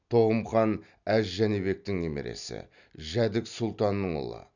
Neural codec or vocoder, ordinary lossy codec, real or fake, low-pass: none; none; real; 7.2 kHz